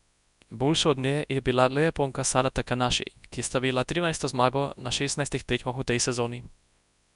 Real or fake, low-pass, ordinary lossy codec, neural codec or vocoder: fake; 10.8 kHz; none; codec, 24 kHz, 0.9 kbps, WavTokenizer, large speech release